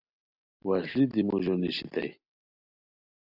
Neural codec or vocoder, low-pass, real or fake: none; 5.4 kHz; real